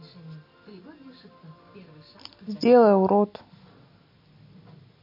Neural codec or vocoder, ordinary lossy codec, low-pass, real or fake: none; MP3, 32 kbps; 5.4 kHz; real